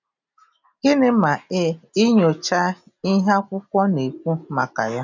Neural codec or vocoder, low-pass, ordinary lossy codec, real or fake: none; 7.2 kHz; none; real